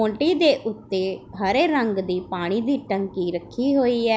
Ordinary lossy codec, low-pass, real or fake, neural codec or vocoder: none; none; real; none